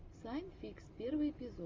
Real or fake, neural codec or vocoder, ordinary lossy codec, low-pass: real; none; Opus, 32 kbps; 7.2 kHz